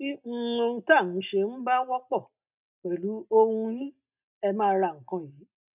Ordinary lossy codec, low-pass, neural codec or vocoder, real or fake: none; 3.6 kHz; none; real